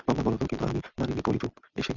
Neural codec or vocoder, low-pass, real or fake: none; 7.2 kHz; real